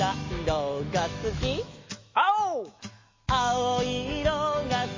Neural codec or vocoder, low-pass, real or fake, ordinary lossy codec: autoencoder, 48 kHz, 128 numbers a frame, DAC-VAE, trained on Japanese speech; 7.2 kHz; fake; MP3, 32 kbps